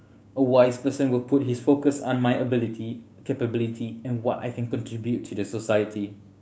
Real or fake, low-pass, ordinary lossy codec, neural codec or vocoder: fake; none; none; codec, 16 kHz, 6 kbps, DAC